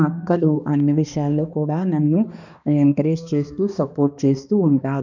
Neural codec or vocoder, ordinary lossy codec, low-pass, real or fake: codec, 16 kHz, 2 kbps, X-Codec, HuBERT features, trained on balanced general audio; none; 7.2 kHz; fake